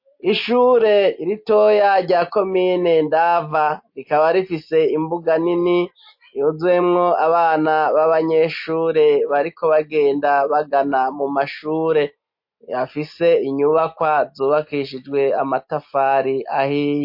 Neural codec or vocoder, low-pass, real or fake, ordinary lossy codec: none; 5.4 kHz; real; MP3, 32 kbps